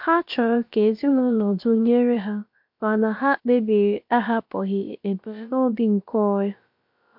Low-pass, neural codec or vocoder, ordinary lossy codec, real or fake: 5.4 kHz; codec, 16 kHz, about 1 kbps, DyCAST, with the encoder's durations; MP3, 48 kbps; fake